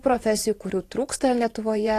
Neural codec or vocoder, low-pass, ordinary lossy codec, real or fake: vocoder, 44.1 kHz, 128 mel bands every 512 samples, BigVGAN v2; 14.4 kHz; AAC, 48 kbps; fake